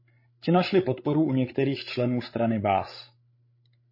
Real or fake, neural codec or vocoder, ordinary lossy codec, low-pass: fake; codec, 16 kHz, 16 kbps, FreqCodec, larger model; MP3, 24 kbps; 5.4 kHz